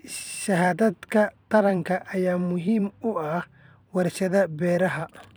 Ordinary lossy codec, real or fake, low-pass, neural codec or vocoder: none; fake; none; vocoder, 44.1 kHz, 128 mel bands every 256 samples, BigVGAN v2